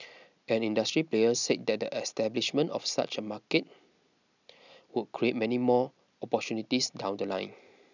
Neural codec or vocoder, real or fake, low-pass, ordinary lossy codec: none; real; 7.2 kHz; none